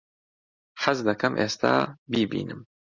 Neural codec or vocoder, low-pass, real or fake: none; 7.2 kHz; real